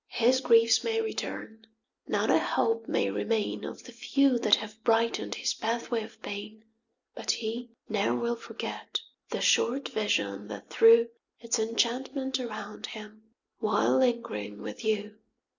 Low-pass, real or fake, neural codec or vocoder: 7.2 kHz; real; none